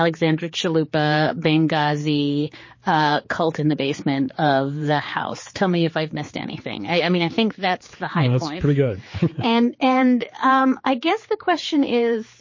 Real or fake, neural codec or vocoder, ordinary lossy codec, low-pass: fake; codec, 16 kHz, 4 kbps, X-Codec, HuBERT features, trained on general audio; MP3, 32 kbps; 7.2 kHz